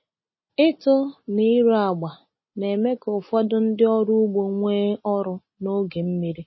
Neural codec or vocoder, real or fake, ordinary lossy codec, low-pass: none; real; MP3, 24 kbps; 7.2 kHz